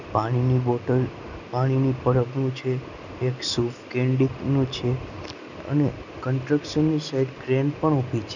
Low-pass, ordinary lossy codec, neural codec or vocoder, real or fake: 7.2 kHz; none; none; real